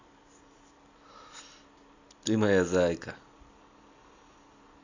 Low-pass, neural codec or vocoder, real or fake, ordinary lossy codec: 7.2 kHz; none; real; AAC, 48 kbps